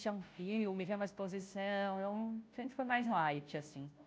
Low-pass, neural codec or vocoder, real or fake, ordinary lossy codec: none; codec, 16 kHz, 0.5 kbps, FunCodec, trained on Chinese and English, 25 frames a second; fake; none